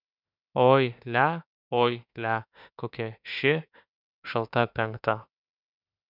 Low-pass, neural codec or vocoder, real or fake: 5.4 kHz; autoencoder, 48 kHz, 32 numbers a frame, DAC-VAE, trained on Japanese speech; fake